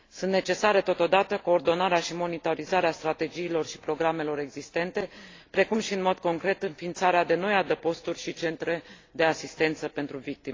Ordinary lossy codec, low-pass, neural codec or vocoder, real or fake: AAC, 32 kbps; 7.2 kHz; none; real